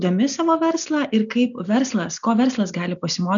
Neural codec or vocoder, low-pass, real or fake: none; 7.2 kHz; real